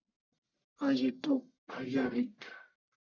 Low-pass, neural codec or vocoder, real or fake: 7.2 kHz; codec, 44.1 kHz, 1.7 kbps, Pupu-Codec; fake